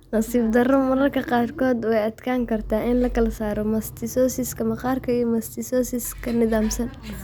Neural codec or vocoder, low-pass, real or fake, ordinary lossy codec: none; none; real; none